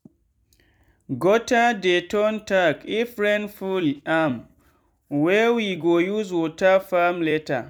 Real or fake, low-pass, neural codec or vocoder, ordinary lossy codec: fake; 19.8 kHz; vocoder, 44.1 kHz, 128 mel bands every 256 samples, BigVGAN v2; none